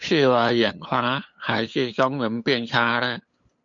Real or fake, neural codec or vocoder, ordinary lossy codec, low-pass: fake; codec, 16 kHz, 8 kbps, FunCodec, trained on LibriTTS, 25 frames a second; MP3, 48 kbps; 7.2 kHz